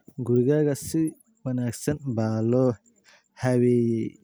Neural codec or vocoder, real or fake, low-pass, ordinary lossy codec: none; real; none; none